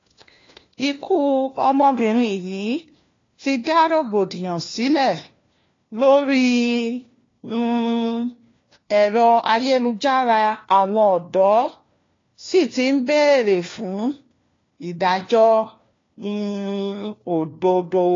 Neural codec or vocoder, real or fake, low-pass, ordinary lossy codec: codec, 16 kHz, 1 kbps, FunCodec, trained on LibriTTS, 50 frames a second; fake; 7.2 kHz; AAC, 32 kbps